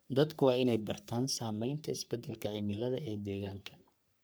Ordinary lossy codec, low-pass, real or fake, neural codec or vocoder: none; none; fake; codec, 44.1 kHz, 3.4 kbps, Pupu-Codec